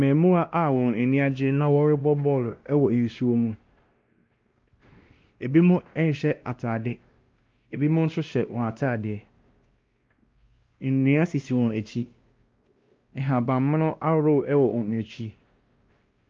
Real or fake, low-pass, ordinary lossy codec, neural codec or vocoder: fake; 7.2 kHz; Opus, 24 kbps; codec, 16 kHz, 1 kbps, X-Codec, WavLM features, trained on Multilingual LibriSpeech